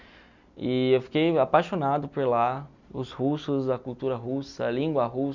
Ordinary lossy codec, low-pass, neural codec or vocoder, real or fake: none; 7.2 kHz; none; real